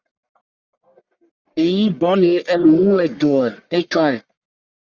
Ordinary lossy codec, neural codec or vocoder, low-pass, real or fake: Opus, 64 kbps; codec, 44.1 kHz, 1.7 kbps, Pupu-Codec; 7.2 kHz; fake